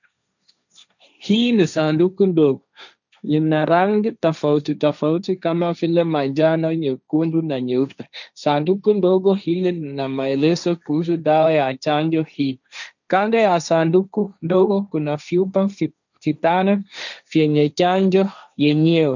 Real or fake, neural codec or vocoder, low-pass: fake; codec, 16 kHz, 1.1 kbps, Voila-Tokenizer; 7.2 kHz